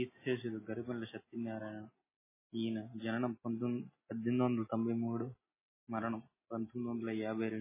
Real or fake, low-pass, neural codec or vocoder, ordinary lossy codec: real; 3.6 kHz; none; MP3, 16 kbps